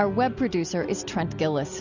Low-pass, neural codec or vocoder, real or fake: 7.2 kHz; none; real